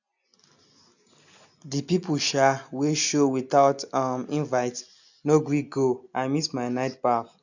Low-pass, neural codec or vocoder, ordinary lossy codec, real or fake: 7.2 kHz; none; none; real